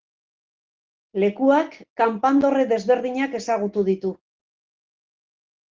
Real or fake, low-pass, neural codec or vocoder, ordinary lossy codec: real; 7.2 kHz; none; Opus, 16 kbps